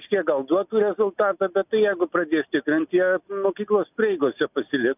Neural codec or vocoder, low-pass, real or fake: none; 3.6 kHz; real